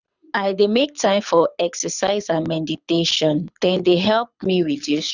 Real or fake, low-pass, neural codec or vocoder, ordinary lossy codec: fake; 7.2 kHz; codec, 24 kHz, 6 kbps, HILCodec; none